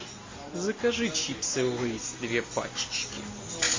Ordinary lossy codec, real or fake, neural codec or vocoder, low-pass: MP3, 32 kbps; real; none; 7.2 kHz